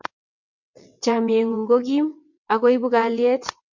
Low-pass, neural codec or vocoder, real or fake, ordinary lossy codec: 7.2 kHz; vocoder, 22.05 kHz, 80 mel bands, WaveNeXt; fake; MP3, 64 kbps